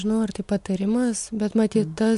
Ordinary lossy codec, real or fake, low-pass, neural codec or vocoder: MP3, 64 kbps; real; 10.8 kHz; none